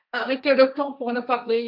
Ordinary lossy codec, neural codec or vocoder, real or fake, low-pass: none; codec, 16 kHz, 1.1 kbps, Voila-Tokenizer; fake; 5.4 kHz